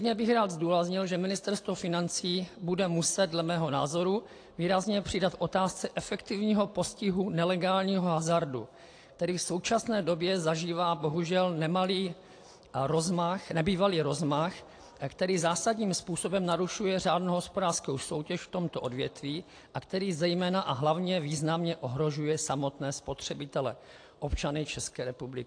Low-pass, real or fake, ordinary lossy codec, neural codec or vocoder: 9.9 kHz; real; AAC, 48 kbps; none